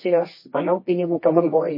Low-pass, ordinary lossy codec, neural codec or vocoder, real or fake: 5.4 kHz; MP3, 24 kbps; codec, 24 kHz, 0.9 kbps, WavTokenizer, medium music audio release; fake